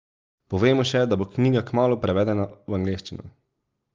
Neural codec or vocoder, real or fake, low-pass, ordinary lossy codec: none; real; 7.2 kHz; Opus, 32 kbps